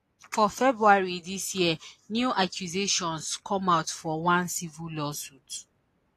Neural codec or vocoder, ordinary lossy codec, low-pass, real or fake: none; AAC, 48 kbps; 14.4 kHz; real